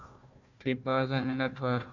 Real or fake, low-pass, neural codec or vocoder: fake; 7.2 kHz; codec, 16 kHz, 1 kbps, FunCodec, trained on Chinese and English, 50 frames a second